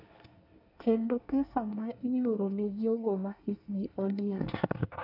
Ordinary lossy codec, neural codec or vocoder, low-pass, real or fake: none; codec, 24 kHz, 1 kbps, SNAC; 5.4 kHz; fake